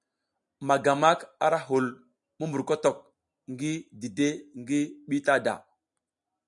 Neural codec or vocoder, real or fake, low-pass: none; real; 10.8 kHz